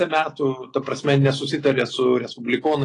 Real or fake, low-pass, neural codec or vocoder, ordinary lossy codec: real; 10.8 kHz; none; AAC, 32 kbps